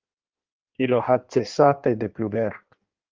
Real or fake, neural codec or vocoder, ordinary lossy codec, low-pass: fake; codec, 16 kHz in and 24 kHz out, 1.1 kbps, FireRedTTS-2 codec; Opus, 24 kbps; 7.2 kHz